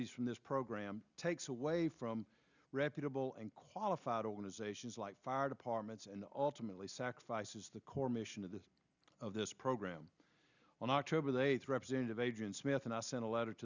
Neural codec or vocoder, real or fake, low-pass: none; real; 7.2 kHz